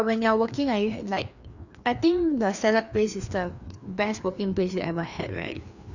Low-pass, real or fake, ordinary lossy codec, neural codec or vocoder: 7.2 kHz; fake; none; codec, 16 kHz, 2 kbps, FreqCodec, larger model